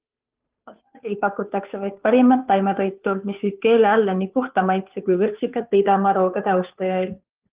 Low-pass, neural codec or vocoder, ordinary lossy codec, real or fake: 3.6 kHz; codec, 16 kHz, 2 kbps, FunCodec, trained on Chinese and English, 25 frames a second; Opus, 32 kbps; fake